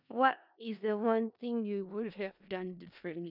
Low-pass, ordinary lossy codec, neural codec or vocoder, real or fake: 5.4 kHz; none; codec, 16 kHz in and 24 kHz out, 0.4 kbps, LongCat-Audio-Codec, four codebook decoder; fake